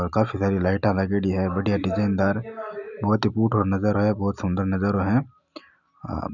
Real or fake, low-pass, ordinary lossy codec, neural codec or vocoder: real; 7.2 kHz; none; none